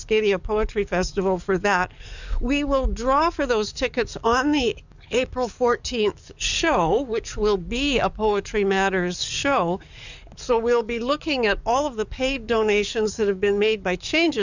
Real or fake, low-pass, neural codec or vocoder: fake; 7.2 kHz; codec, 44.1 kHz, 7.8 kbps, DAC